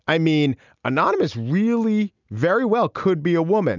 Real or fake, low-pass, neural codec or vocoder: real; 7.2 kHz; none